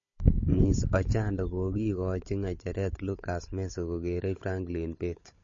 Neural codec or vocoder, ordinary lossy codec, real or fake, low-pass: codec, 16 kHz, 16 kbps, FunCodec, trained on Chinese and English, 50 frames a second; MP3, 32 kbps; fake; 7.2 kHz